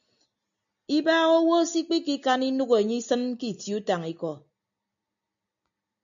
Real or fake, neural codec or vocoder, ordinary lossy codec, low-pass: real; none; MP3, 96 kbps; 7.2 kHz